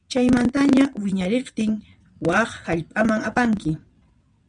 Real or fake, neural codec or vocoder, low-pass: fake; vocoder, 22.05 kHz, 80 mel bands, WaveNeXt; 9.9 kHz